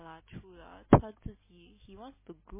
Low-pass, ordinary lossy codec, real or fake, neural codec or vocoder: 3.6 kHz; MP3, 16 kbps; real; none